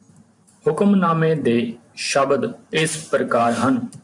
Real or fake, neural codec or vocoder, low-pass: fake; vocoder, 44.1 kHz, 128 mel bands every 512 samples, BigVGAN v2; 10.8 kHz